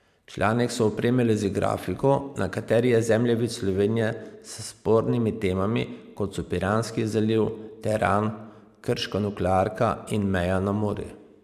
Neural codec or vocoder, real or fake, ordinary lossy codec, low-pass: vocoder, 44.1 kHz, 128 mel bands every 512 samples, BigVGAN v2; fake; none; 14.4 kHz